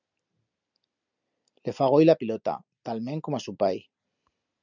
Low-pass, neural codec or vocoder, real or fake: 7.2 kHz; none; real